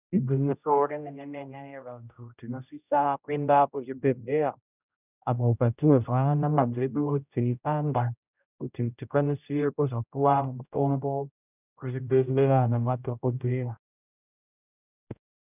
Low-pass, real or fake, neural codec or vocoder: 3.6 kHz; fake; codec, 16 kHz, 0.5 kbps, X-Codec, HuBERT features, trained on general audio